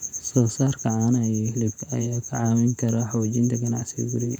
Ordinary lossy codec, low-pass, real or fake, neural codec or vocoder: none; 19.8 kHz; fake; vocoder, 48 kHz, 128 mel bands, Vocos